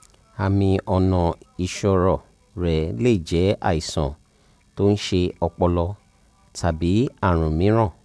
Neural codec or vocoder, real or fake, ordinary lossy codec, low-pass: none; real; none; none